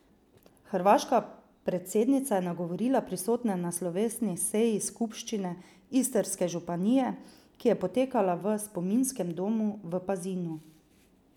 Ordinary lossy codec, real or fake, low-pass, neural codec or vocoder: none; real; 19.8 kHz; none